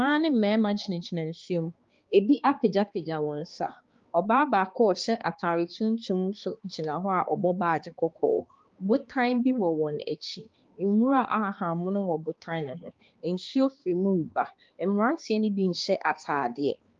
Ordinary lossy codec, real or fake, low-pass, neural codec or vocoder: Opus, 32 kbps; fake; 7.2 kHz; codec, 16 kHz, 2 kbps, X-Codec, HuBERT features, trained on balanced general audio